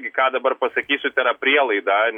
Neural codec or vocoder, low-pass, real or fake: none; 19.8 kHz; real